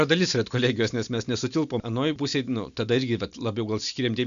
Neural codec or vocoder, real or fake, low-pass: none; real; 7.2 kHz